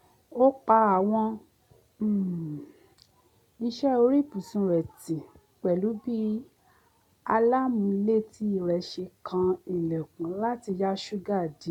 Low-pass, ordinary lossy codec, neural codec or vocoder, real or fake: 19.8 kHz; none; none; real